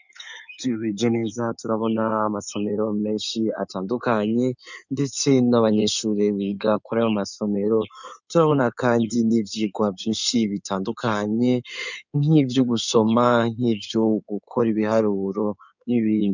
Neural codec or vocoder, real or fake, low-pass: codec, 16 kHz in and 24 kHz out, 2.2 kbps, FireRedTTS-2 codec; fake; 7.2 kHz